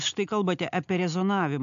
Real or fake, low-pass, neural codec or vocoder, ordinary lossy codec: real; 7.2 kHz; none; AAC, 64 kbps